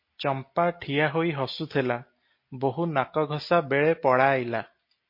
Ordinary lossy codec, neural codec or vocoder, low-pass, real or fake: MP3, 32 kbps; none; 5.4 kHz; real